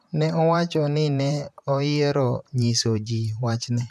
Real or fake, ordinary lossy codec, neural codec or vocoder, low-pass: fake; none; vocoder, 44.1 kHz, 128 mel bands, Pupu-Vocoder; 14.4 kHz